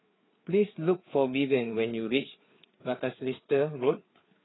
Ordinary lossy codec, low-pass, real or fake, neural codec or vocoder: AAC, 16 kbps; 7.2 kHz; fake; codec, 16 kHz, 4 kbps, FreqCodec, larger model